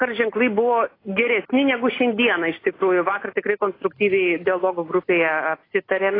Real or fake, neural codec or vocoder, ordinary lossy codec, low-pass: real; none; AAC, 24 kbps; 5.4 kHz